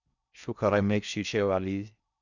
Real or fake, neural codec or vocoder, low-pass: fake; codec, 16 kHz in and 24 kHz out, 0.6 kbps, FocalCodec, streaming, 4096 codes; 7.2 kHz